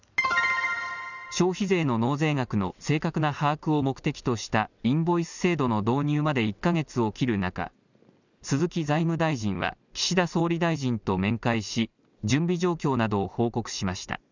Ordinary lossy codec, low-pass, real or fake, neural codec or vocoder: none; 7.2 kHz; real; none